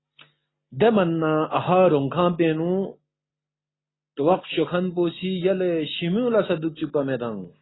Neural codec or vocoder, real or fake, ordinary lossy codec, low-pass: none; real; AAC, 16 kbps; 7.2 kHz